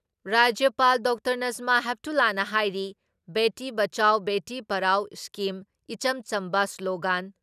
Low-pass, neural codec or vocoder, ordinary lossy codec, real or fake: 14.4 kHz; none; none; real